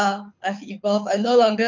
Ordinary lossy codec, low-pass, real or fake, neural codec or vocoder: MP3, 48 kbps; 7.2 kHz; fake; codec, 24 kHz, 6 kbps, HILCodec